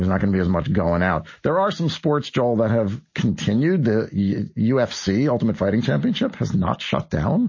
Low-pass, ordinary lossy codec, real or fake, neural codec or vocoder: 7.2 kHz; MP3, 32 kbps; real; none